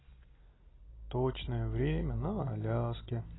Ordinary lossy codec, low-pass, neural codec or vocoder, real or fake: AAC, 16 kbps; 7.2 kHz; none; real